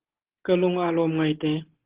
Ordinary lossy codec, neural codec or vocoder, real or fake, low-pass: Opus, 16 kbps; none; real; 3.6 kHz